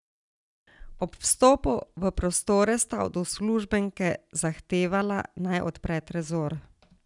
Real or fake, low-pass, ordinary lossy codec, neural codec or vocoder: real; 10.8 kHz; none; none